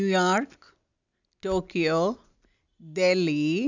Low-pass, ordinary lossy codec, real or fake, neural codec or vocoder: 7.2 kHz; none; real; none